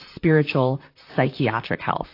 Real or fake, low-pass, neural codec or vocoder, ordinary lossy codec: fake; 5.4 kHz; vocoder, 44.1 kHz, 128 mel bands, Pupu-Vocoder; AAC, 32 kbps